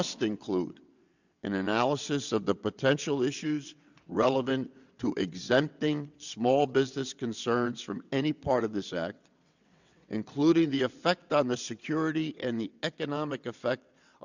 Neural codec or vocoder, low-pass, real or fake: vocoder, 22.05 kHz, 80 mel bands, WaveNeXt; 7.2 kHz; fake